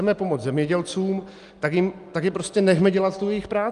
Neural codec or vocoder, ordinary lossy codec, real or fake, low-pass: none; Opus, 32 kbps; real; 10.8 kHz